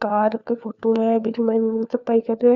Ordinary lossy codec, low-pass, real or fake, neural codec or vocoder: none; 7.2 kHz; fake; codec, 16 kHz, 4 kbps, FunCodec, trained on LibriTTS, 50 frames a second